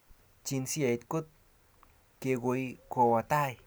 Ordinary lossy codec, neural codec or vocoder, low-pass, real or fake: none; none; none; real